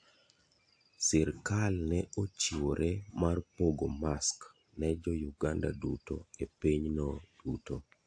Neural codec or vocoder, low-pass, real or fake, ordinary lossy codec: none; 9.9 kHz; real; AAC, 64 kbps